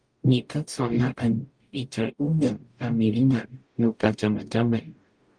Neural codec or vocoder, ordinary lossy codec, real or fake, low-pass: codec, 44.1 kHz, 0.9 kbps, DAC; Opus, 32 kbps; fake; 9.9 kHz